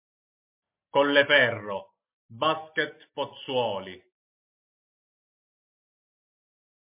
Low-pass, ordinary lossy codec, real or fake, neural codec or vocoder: 3.6 kHz; MP3, 24 kbps; real; none